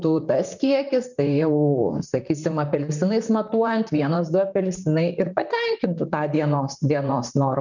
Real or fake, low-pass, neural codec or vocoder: fake; 7.2 kHz; vocoder, 44.1 kHz, 128 mel bands, Pupu-Vocoder